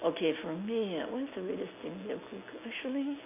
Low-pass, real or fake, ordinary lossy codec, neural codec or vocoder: 3.6 kHz; real; none; none